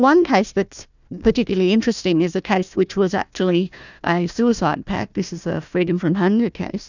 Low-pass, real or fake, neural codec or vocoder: 7.2 kHz; fake; codec, 16 kHz, 1 kbps, FunCodec, trained on Chinese and English, 50 frames a second